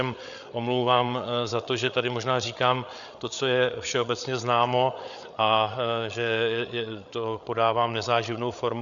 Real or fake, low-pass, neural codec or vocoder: fake; 7.2 kHz; codec, 16 kHz, 8 kbps, FreqCodec, larger model